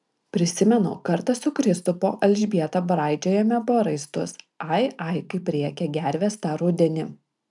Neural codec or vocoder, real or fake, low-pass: none; real; 10.8 kHz